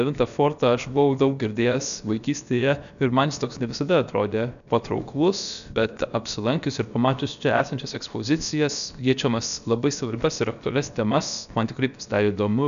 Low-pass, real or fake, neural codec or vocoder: 7.2 kHz; fake; codec, 16 kHz, about 1 kbps, DyCAST, with the encoder's durations